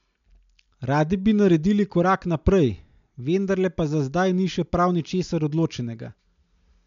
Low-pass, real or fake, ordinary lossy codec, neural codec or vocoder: 7.2 kHz; real; MP3, 64 kbps; none